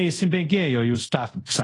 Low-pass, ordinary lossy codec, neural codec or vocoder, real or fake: 10.8 kHz; AAC, 32 kbps; codec, 24 kHz, 0.5 kbps, DualCodec; fake